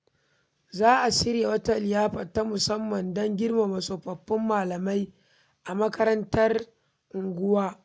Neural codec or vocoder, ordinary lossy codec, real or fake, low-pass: none; none; real; none